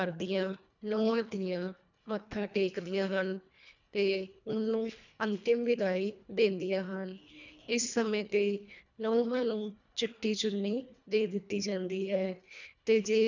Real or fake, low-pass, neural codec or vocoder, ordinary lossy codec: fake; 7.2 kHz; codec, 24 kHz, 1.5 kbps, HILCodec; none